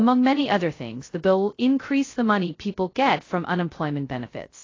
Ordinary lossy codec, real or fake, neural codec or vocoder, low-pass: AAC, 32 kbps; fake; codec, 16 kHz, 0.2 kbps, FocalCodec; 7.2 kHz